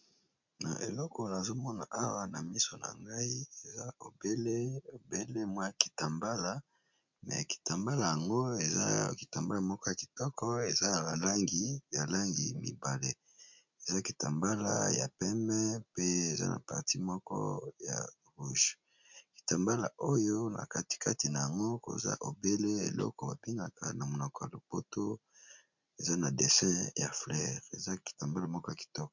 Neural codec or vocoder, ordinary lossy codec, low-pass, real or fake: none; MP3, 64 kbps; 7.2 kHz; real